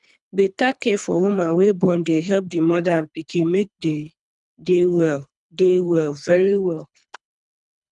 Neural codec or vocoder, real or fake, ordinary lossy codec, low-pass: codec, 24 kHz, 3 kbps, HILCodec; fake; none; 10.8 kHz